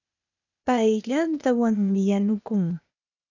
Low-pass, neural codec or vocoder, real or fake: 7.2 kHz; codec, 16 kHz, 0.8 kbps, ZipCodec; fake